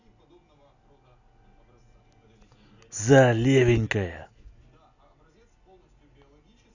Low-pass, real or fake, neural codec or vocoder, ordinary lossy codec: 7.2 kHz; real; none; none